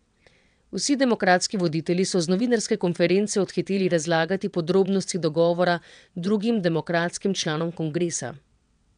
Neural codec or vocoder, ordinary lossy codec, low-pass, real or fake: vocoder, 22.05 kHz, 80 mel bands, WaveNeXt; none; 9.9 kHz; fake